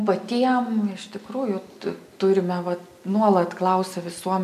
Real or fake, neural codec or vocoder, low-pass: real; none; 14.4 kHz